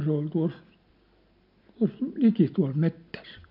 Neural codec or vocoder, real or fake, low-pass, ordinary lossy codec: none; real; 5.4 kHz; none